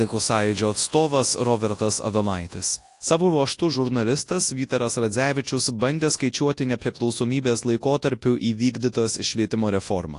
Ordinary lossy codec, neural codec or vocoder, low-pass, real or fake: AAC, 48 kbps; codec, 24 kHz, 0.9 kbps, WavTokenizer, large speech release; 10.8 kHz; fake